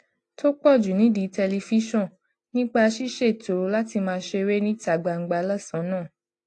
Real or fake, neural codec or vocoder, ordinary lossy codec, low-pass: real; none; AAC, 48 kbps; 10.8 kHz